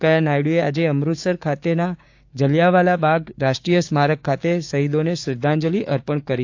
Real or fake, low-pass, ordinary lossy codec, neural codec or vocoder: fake; 7.2 kHz; AAC, 48 kbps; codec, 16 kHz, 6 kbps, DAC